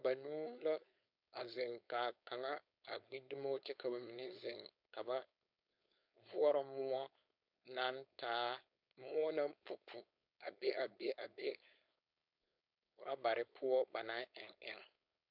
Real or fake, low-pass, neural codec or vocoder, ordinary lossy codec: fake; 5.4 kHz; codec, 16 kHz, 4.8 kbps, FACodec; MP3, 48 kbps